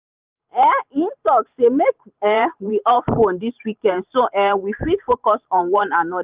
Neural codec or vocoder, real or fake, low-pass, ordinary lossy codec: vocoder, 44.1 kHz, 128 mel bands every 512 samples, BigVGAN v2; fake; 3.6 kHz; Opus, 24 kbps